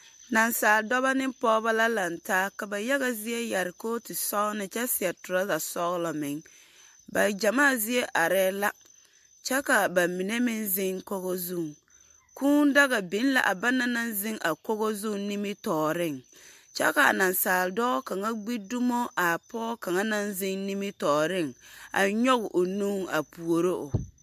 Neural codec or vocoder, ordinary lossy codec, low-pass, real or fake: none; MP3, 64 kbps; 14.4 kHz; real